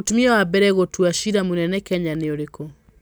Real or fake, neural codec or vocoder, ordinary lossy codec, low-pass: real; none; none; none